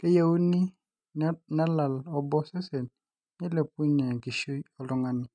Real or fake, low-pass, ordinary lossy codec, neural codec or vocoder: real; 9.9 kHz; none; none